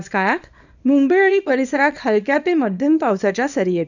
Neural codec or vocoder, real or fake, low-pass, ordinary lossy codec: codec, 24 kHz, 0.9 kbps, WavTokenizer, small release; fake; 7.2 kHz; none